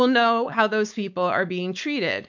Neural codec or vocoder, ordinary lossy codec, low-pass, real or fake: codec, 16 kHz, 4.8 kbps, FACodec; MP3, 64 kbps; 7.2 kHz; fake